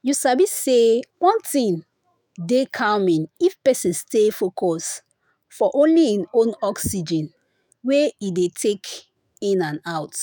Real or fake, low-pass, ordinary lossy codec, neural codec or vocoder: fake; none; none; autoencoder, 48 kHz, 128 numbers a frame, DAC-VAE, trained on Japanese speech